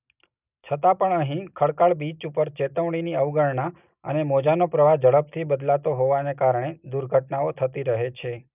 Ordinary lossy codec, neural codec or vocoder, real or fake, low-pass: none; none; real; 3.6 kHz